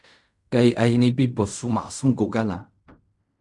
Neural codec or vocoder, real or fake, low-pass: codec, 16 kHz in and 24 kHz out, 0.4 kbps, LongCat-Audio-Codec, fine tuned four codebook decoder; fake; 10.8 kHz